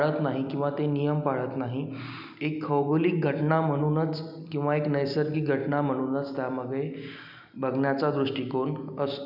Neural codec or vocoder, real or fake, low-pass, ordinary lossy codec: none; real; 5.4 kHz; none